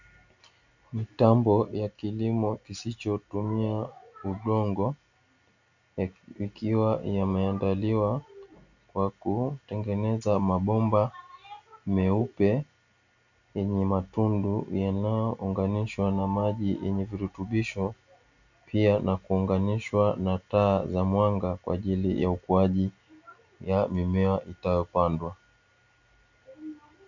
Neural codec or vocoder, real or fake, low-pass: none; real; 7.2 kHz